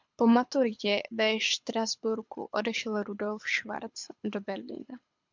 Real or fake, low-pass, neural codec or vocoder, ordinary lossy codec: fake; 7.2 kHz; codec, 24 kHz, 6 kbps, HILCodec; MP3, 64 kbps